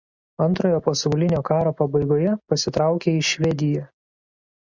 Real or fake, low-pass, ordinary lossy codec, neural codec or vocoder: real; 7.2 kHz; Opus, 64 kbps; none